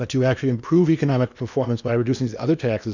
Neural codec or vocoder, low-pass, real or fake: codec, 16 kHz in and 24 kHz out, 0.8 kbps, FocalCodec, streaming, 65536 codes; 7.2 kHz; fake